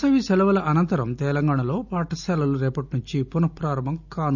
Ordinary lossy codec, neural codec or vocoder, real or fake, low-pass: none; none; real; 7.2 kHz